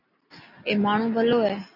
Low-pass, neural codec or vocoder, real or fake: 5.4 kHz; none; real